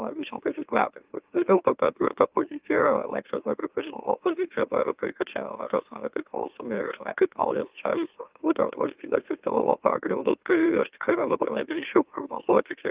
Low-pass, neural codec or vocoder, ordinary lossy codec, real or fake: 3.6 kHz; autoencoder, 44.1 kHz, a latent of 192 numbers a frame, MeloTTS; Opus, 24 kbps; fake